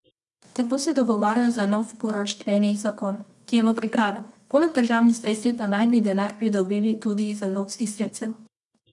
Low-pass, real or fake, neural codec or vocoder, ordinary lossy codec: 10.8 kHz; fake; codec, 24 kHz, 0.9 kbps, WavTokenizer, medium music audio release; none